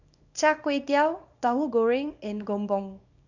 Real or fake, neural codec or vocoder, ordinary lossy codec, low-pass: fake; codec, 24 kHz, 0.9 kbps, WavTokenizer, small release; none; 7.2 kHz